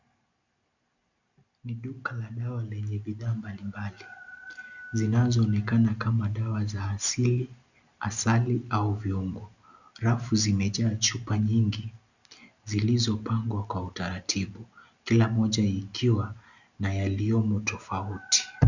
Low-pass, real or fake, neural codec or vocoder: 7.2 kHz; real; none